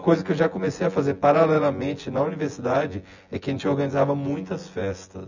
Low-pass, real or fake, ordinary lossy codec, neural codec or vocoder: 7.2 kHz; fake; none; vocoder, 24 kHz, 100 mel bands, Vocos